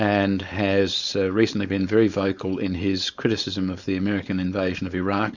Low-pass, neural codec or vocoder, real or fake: 7.2 kHz; codec, 16 kHz, 4.8 kbps, FACodec; fake